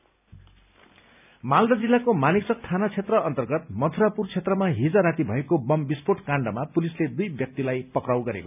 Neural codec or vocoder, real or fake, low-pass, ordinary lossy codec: none; real; 3.6 kHz; none